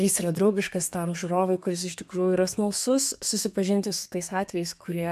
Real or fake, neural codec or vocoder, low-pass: fake; codec, 32 kHz, 1.9 kbps, SNAC; 14.4 kHz